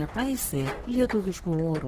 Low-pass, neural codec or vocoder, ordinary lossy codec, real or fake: 14.4 kHz; codec, 44.1 kHz, 3.4 kbps, Pupu-Codec; Opus, 16 kbps; fake